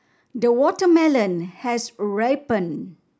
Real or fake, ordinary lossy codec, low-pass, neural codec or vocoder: real; none; none; none